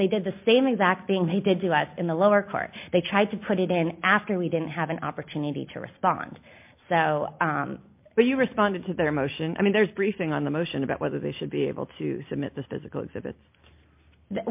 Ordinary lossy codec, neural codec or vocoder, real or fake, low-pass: MP3, 32 kbps; none; real; 3.6 kHz